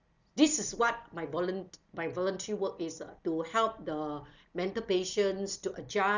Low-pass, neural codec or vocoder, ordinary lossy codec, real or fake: 7.2 kHz; none; none; real